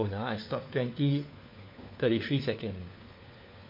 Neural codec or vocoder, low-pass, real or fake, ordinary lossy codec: codec, 16 kHz, 4 kbps, FunCodec, trained on LibriTTS, 50 frames a second; 5.4 kHz; fake; MP3, 32 kbps